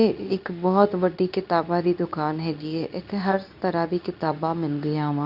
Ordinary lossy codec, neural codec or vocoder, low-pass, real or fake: none; codec, 24 kHz, 0.9 kbps, WavTokenizer, medium speech release version 2; 5.4 kHz; fake